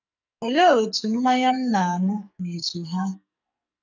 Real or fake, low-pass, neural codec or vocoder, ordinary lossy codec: fake; 7.2 kHz; codec, 44.1 kHz, 2.6 kbps, SNAC; none